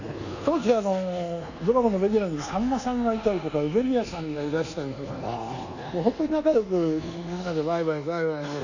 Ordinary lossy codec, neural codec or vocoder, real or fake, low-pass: none; codec, 24 kHz, 1.2 kbps, DualCodec; fake; 7.2 kHz